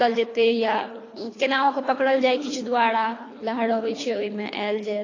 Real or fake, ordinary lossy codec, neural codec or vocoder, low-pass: fake; AAC, 32 kbps; codec, 24 kHz, 3 kbps, HILCodec; 7.2 kHz